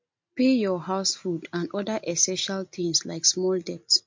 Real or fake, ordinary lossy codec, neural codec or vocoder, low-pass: real; MP3, 48 kbps; none; 7.2 kHz